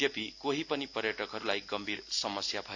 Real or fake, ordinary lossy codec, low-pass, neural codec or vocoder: real; AAC, 48 kbps; 7.2 kHz; none